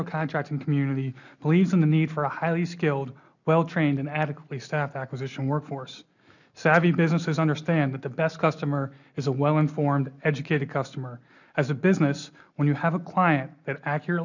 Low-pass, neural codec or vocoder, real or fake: 7.2 kHz; none; real